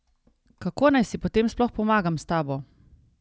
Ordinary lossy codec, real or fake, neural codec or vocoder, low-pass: none; real; none; none